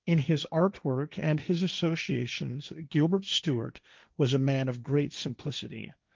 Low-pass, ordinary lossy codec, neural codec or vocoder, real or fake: 7.2 kHz; Opus, 24 kbps; codec, 16 kHz, 1.1 kbps, Voila-Tokenizer; fake